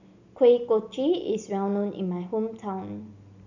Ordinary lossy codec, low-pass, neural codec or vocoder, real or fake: none; 7.2 kHz; none; real